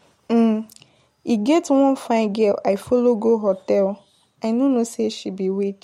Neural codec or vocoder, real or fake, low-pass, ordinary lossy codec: none; real; 19.8 kHz; MP3, 64 kbps